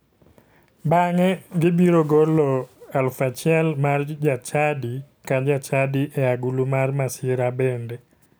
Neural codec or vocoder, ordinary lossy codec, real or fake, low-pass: none; none; real; none